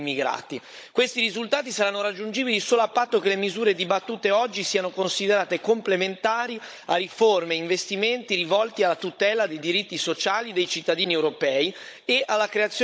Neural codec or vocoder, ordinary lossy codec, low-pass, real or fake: codec, 16 kHz, 16 kbps, FunCodec, trained on Chinese and English, 50 frames a second; none; none; fake